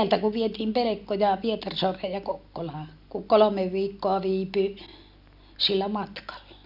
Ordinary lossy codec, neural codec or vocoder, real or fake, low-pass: none; none; real; 5.4 kHz